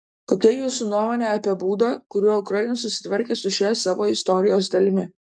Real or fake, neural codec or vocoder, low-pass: fake; codec, 44.1 kHz, 7.8 kbps, DAC; 9.9 kHz